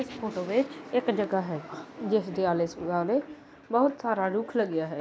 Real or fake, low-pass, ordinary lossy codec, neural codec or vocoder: real; none; none; none